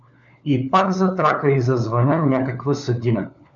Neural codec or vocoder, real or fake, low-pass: codec, 16 kHz, 4 kbps, FunCodec, trained on LibriTTS, 50 frames a second; fake; 7.2 kHz